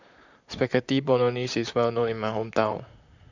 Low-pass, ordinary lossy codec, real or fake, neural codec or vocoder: 7.2 kHz; none; fake; vocoder, 44.1 kHz, 128 mel bands, Pupu-Vocoder